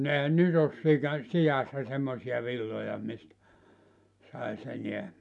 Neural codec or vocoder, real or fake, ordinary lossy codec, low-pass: none; real; none; 10.8 kHz